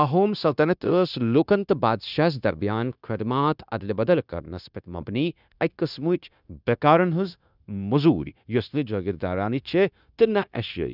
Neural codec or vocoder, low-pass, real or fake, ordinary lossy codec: codec, 16 kHz, 0.9 kbps, LongCat-Audio-Codec; 5.4 kHz; fake; none